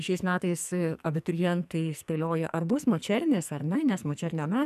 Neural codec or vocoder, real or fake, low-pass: codec, 44.1 kHz, 2.6 kbps, SNAC; fake; 14.4 kHz